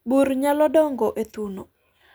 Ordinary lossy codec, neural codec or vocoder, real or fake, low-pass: none; none; real; none